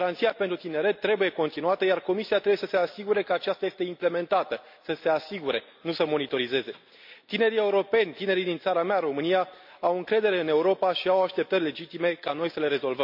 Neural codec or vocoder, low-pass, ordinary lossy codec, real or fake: none; 5.4 kHz; MP3, 48 kbps; real